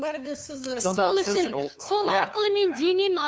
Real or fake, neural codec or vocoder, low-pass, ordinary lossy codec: fake; codec, 16 kHz, 2 kbps, FunCodec, trained on LibriTTS, 25 frames a second; none; none